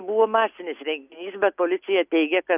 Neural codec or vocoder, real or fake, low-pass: none; real; 3.6 kHz